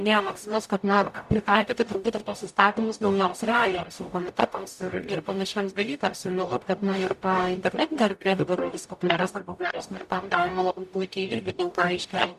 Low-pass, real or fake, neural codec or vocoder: 14.4 kHz; fake; codec, 44.1 kHz, 0.9 kbps, DAC